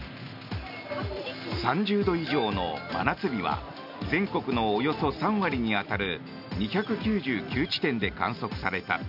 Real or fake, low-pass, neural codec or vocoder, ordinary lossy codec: real; 5.4 kHz; none; none